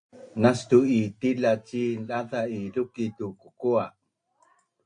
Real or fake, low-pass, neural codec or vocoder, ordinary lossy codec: real; 9.9 kHz; none; AAC, 64 kbps